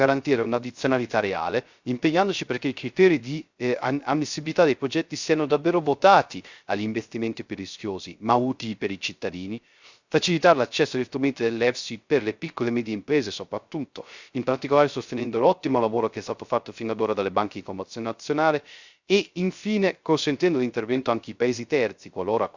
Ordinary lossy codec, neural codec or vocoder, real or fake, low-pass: Opus, 64 kbps; codec, 16 kHz, 0.3 kbps, FocalCodec; fake; 7.2 kHz